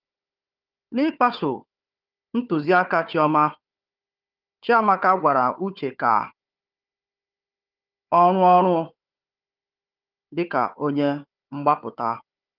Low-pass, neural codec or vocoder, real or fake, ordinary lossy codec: 5.4 kHz; codec, 16 kHz, 4 kbps, FunCodec, trained on Chinese and English, 50 frames a second; fake; Opus, 24 kbps